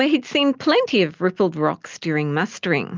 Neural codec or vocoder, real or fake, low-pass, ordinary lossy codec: none; real; 7.2 kHz; Opus, 24 kbps